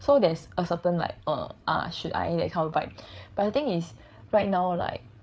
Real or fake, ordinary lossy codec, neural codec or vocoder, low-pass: fake; none; codec, 16 kHz, 8 kbps, FreqCodec, larger model; none